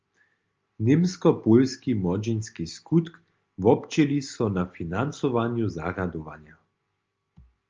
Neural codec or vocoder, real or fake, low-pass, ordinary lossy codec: none; real; 7.2 kHz; Opus, 24 kbps